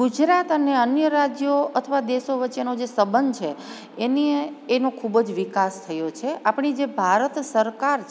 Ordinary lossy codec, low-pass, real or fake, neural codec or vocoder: none; none; real; none